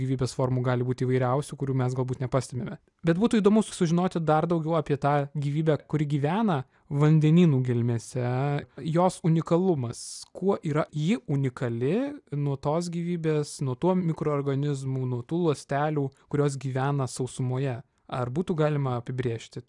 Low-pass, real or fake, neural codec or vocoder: 10.8 kHz; real; none